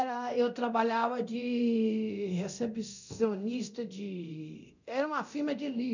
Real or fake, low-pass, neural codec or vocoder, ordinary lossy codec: fake; 7.2 kHz; codec, 24 kHz, 0.9 kbps, DualCodec; none